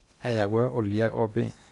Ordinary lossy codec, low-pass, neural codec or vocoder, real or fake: none; 10.8 kHz; codec, 16 kHz in and 24 kHz out, 0.8 kbps, FocalCodec, streaming, 65536 codes; fake